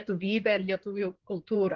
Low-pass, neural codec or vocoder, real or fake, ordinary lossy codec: 7.2 kHz; codec, 16 kHz in and 24 kHz out, 2.2 kbps, FireRedTTS-2 codec; fake; Opus, 16 kbps